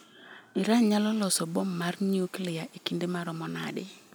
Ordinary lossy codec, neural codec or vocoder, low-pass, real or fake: none; none; none; real